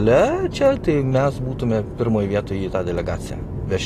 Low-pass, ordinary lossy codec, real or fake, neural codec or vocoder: 14.4 kHz; AAC, 48 kbps; real; none